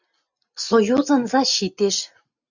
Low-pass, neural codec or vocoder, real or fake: 7.2 kHz; none; real